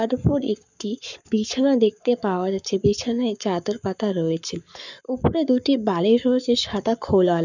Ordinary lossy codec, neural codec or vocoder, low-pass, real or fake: none; codec, 44.1 kHz, 7.8 kbps, Pupu-Codec; 7.2 kHz; fake